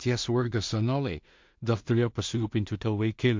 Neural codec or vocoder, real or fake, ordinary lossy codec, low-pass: codec, 16 kHz in and 24 kHz out, 0.4 kbps, LongCat-Audio-Codec, two codebook decoder; fake; MP3, 48 kbps; 7.2 kHz